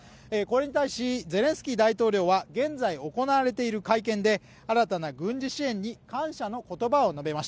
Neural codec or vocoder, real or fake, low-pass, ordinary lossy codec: none; real; none; none